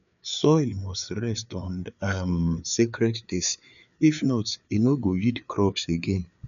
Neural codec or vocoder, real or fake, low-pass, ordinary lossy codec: codec, 16 kHz, 4 kbps, FreqCodec, larger model; fake; 7.2 kHz; none